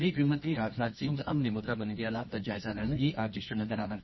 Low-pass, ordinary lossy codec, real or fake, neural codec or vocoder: 7.2 kHz; MP3, 24 kbps; fake; codec, 24 kHz, 0.9 kbps, WavTokenizer, medium music audio release